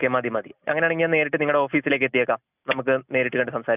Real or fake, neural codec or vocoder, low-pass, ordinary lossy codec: real; none; 3.6 kHz; none